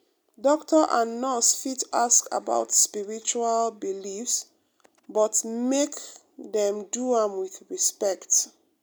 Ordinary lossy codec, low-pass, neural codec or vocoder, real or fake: none; none; none; real